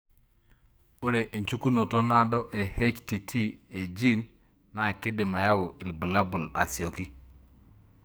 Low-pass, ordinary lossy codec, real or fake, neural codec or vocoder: none; none; fake; codec, 44.1 kHz, 2.6 kbps, SNAC